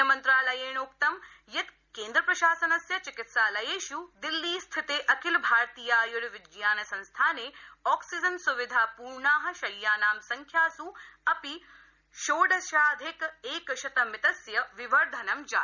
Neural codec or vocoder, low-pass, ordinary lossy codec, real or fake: none; 7.2 kHz; none; real